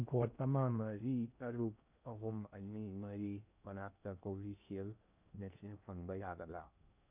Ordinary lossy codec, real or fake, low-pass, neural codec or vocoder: none; fake; 3.6 kHz; codec, 16 kHz in and 24 kHz out, 0.6 kbps, FocalCodec, streaming, 2048 codes